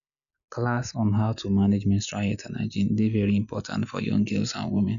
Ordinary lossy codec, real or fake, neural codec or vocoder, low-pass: none; real; none; 7.2 kHz